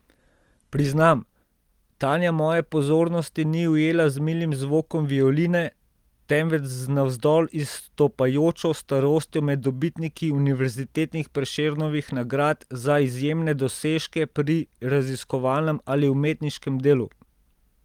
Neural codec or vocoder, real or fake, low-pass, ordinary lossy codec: none; real; 19.8 kHz; Opus, 24 kbps